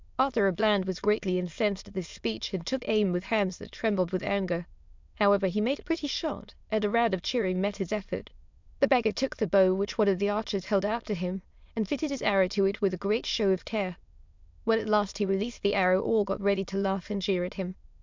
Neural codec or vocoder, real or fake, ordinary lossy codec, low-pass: autoencoder, 22.05 kHz, a latent of 192 numbers a frame, VITS, trained on many speakers; fake; MP3, 64 kbps; 7.2 kHz